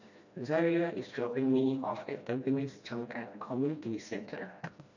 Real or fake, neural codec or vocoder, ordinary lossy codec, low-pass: fake; codec, 16 kHz, 1 kbps, FreqCodec, smaller model; none; 7.2 kHz